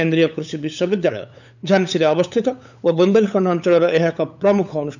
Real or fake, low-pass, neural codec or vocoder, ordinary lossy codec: fake; 7.2 kHz; codec, 16 kHz, 8 kbps, FunCodec, trained on LibriTTS, 25 frames a second; none